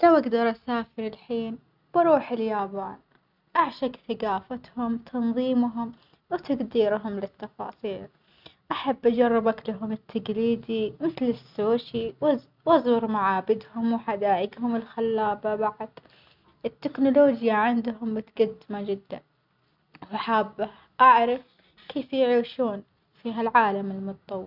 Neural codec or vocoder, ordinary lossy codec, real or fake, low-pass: none; none; real; 5.4 kHz